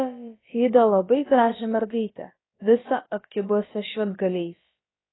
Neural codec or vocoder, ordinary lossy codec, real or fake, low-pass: codec, 16 kHz, about 1 kbps, DyCAST, with the encoder's durations; AAC, 16 kbps; fake; 7.2 kHz